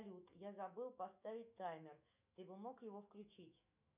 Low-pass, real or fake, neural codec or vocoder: 3.6 kHz; fake; autoencoder, 48 kHz, 128 numbers a frame, DAC-VAE, trained on Japanese speech